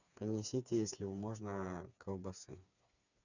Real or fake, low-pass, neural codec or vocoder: fake; 7.2 kHz; codec, 16 kHz, 4 kbps, FreqCodec, smaller model